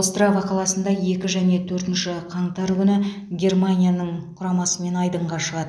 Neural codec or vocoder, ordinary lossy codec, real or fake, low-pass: none; none; real; none